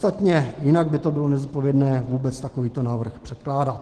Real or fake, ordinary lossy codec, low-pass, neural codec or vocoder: fake; Opus, 16 kbps; 10.8 kHz; autoencoder, 48 kHz, 128 numbers a frame, DAC-VAE, trained on Japanese speech